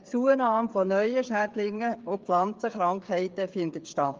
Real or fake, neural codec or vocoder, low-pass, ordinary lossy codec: fake; codec, 16 kHz, 8 kbps, FreqCodec, smaller model; 7.2 kHz; Opus, 32 kbps